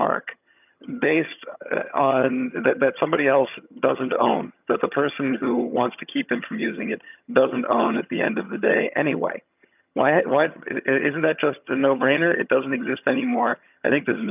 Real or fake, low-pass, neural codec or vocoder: fake; 3.6 kHz; vocoder, 22.05 kHz, 80 mel bands, HiFi-GAN